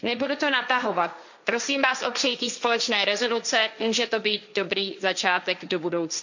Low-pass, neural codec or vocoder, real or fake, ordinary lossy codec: 7.2 kHz; codec, 16 kHz, 1.1 kbps, Voila-Tokenizer; fake; none